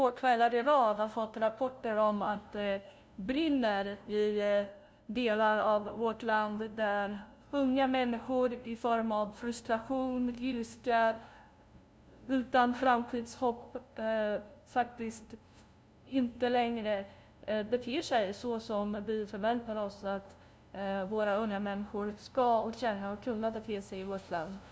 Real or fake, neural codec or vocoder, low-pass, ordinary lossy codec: fake; codec, 16 kHz, 0.5 kbps, FunCodec, trained on LibriTTS, 25 frames a second; none; none